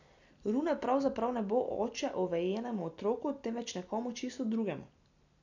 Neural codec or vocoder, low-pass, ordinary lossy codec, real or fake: none; 7.2 kHz; none; real